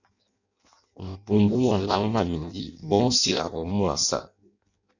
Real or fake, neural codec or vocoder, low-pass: fake; codec, 16 kHz in and 24 kHz out, 0.6 kbps, FireRedTTS-2 codec; 7.2 kHz